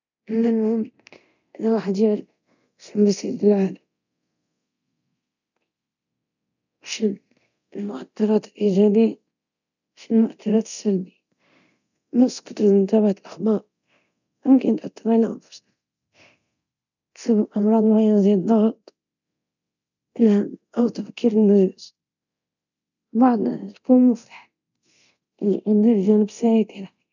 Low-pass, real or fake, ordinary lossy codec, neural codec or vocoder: 7.2 kHz; fake; none; codec, 24 kHz, 0.9 kbps, DualCodec